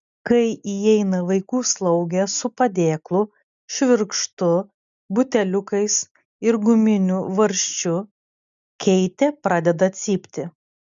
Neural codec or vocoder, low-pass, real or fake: none; 7.2 kHz; real